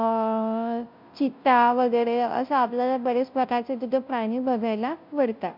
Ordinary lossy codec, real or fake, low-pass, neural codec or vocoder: MP3, 48 kbps; fake; 5.4 kHz; codec, 16 kHz, 0.5 kbps, FunCodec, trained on Chinese and English, 25 frames a second